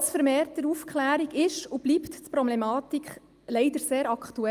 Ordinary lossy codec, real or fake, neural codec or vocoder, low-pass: Opus, 32 kbps; real; none; 14.4 kHz